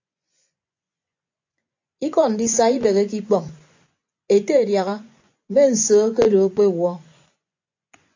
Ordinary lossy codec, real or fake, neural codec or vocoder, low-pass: AAC, 48 kbps; fake; vocoder, 24 kHz, 100 mel bands, Vocos; 7.2 kHz